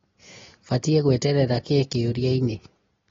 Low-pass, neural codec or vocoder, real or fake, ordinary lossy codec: 7.2 kHz; none; real; AAC, 24 kbps